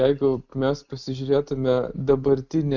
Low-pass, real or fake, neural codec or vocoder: 7.2 kHz; real; none